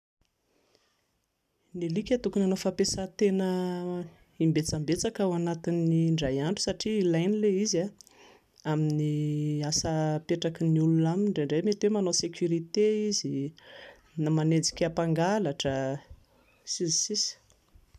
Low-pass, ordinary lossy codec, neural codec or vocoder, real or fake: 14.4 kHz; none; none; real